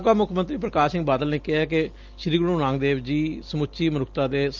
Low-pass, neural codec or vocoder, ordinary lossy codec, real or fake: 7.2 kHz; none; Opus, 32 kbps; real